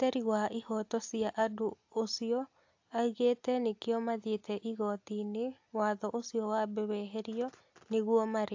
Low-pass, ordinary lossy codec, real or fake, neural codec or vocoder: 7.2 kHz; AAC, 48 kbps; real; none